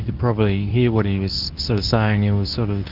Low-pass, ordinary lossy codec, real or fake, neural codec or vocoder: 5.4 kHz; Opus, 32 kbps; fake; codec, 24 kHz, 0.9 kbps, WavTokenizer, medium speech release version 2